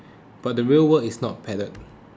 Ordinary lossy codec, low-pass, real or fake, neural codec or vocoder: none; none; real; none